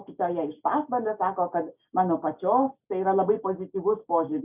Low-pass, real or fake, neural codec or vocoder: 3.6 kHz; real; none